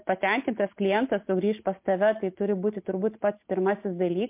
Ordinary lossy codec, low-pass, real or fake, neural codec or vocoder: MP3, 32 kbps; 3.6 kHz; real; none